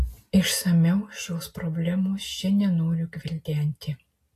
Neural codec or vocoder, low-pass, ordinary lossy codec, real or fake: none; 14.4 kHz; AAC, 48 kbps; real